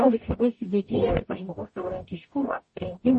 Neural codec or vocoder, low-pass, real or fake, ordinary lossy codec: codec, 44.1 kHz, 0.9 kbps, DAC; 10.8 kHz; fake; MP3, 32 kbps